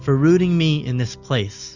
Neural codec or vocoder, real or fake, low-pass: none; real; 7.2 kHz